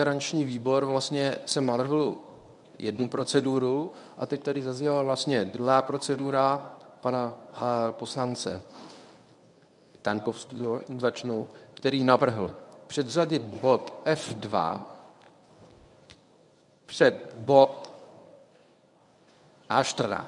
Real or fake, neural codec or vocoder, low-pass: fake; codec, 24 kHz, 0.9 kbps, WavTokenizer, medium speech release version 1; 10.8 kHz